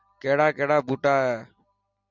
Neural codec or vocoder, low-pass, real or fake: none; 7.2 kHz; real